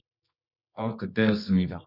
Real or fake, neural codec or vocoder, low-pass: fake; codec, 24 kHz, 0.9 kbps, WavTokenizer, medium music audio release; 5.4 kHz